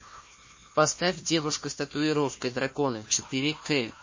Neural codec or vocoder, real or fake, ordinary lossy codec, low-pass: codec, 16 kHz, 1 kbps, FunCodec, trained on Chinese and English, 50 frames a second; fake; MP3, 32 kbps; 7.2 kHz